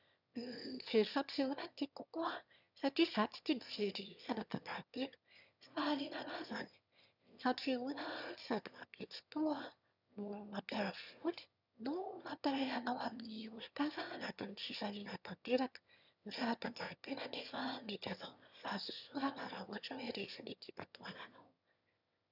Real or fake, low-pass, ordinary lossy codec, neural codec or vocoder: fake; 5.4 kHz; none; autoencoder, 22.05 kHz, a latent of 192 numbers a frame, VITS, trained on one speaker